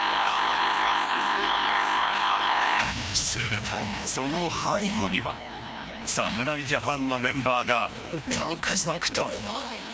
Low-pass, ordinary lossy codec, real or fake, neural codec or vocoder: none; none; fake; codec, 16 kHz, 1 kbps, FreqCodec, larger model